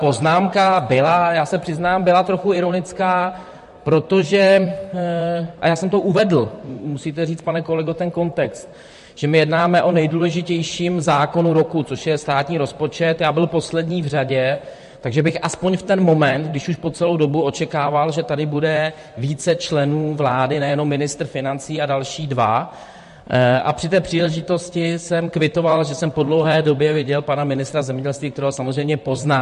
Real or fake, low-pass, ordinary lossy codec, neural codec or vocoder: fake; 14.4 kHz; MP3, 48 kbps; vocoder, 44.1 kHz, 128 mel bands, Pupu-Vocoder